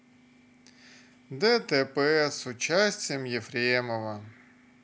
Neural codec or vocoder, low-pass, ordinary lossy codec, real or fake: none; none; none; real